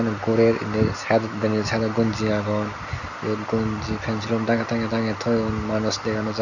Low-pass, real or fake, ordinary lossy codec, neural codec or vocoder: 7.2 kHz; real; none; none